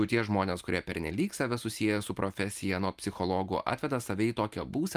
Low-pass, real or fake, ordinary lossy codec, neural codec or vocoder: 14.4 kHz; real; Opus, 32 kbps; none